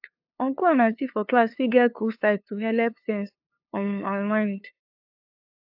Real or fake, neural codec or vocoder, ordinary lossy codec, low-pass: fake; codec, 16 kHz, 2 kbps, FunCodec, trained on LibriTTS, 25 frames a second; AAC, 48 kbps; 5.4 kHz